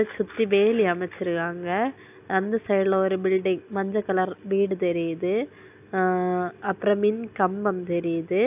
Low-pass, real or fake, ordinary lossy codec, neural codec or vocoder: 3.6 kHz; real; AAC, 32 kbps; none